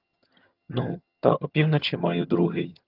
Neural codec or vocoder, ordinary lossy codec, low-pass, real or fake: vocoder, 22.05 kHz, 80 mel bands, HiFi-GAN; Opus, 32 kbps; 5.4 kHz; fake